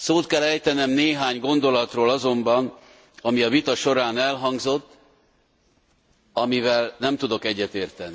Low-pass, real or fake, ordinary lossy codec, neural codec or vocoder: none; real; none; none